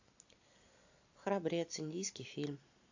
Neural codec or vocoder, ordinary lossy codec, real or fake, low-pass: none; AAC, 48 kbps; real; 7.2 kHz